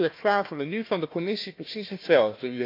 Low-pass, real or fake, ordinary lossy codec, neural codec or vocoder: 5.4 kHz; fake; AAC, 32 kbps; codec, 16 kHz, 1 kbps, FunCodec, trained on Chinese and English, 50 frames a second